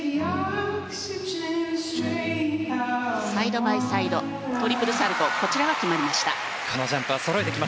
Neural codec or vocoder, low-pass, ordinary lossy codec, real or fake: none; none; none; real